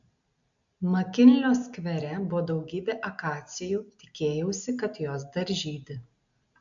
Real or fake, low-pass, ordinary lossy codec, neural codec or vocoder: real; 7.2 kHz; AAC, 64 kbps; none